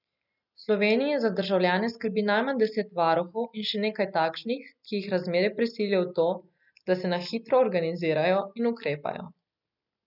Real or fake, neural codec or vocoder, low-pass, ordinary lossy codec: real; none; 5.4 kHz; none